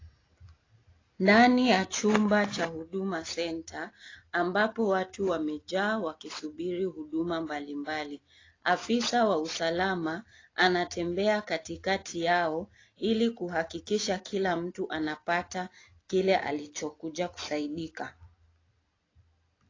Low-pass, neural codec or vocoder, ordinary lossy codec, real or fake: 7.2 kHz; none; AAC, 32 kbps; real